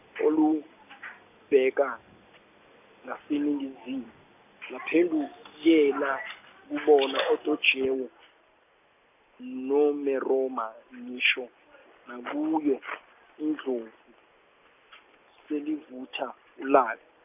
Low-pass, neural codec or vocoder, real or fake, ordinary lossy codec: 3.6 kHz; none; real; none